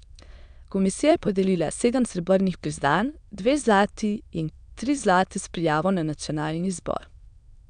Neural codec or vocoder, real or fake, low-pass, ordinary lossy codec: autoencoder, 22.05 kHz, a latent of 192 numbers a frame, VITS, trained on many speakers; fake; 9.9 kHz; none